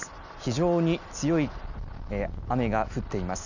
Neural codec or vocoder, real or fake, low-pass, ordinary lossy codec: none; real; 7.2 kHz; none